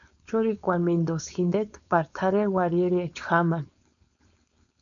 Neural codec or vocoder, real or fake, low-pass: codec, 16 kHz, 4.8 kbps, FACodec; fake; 7.2 kHz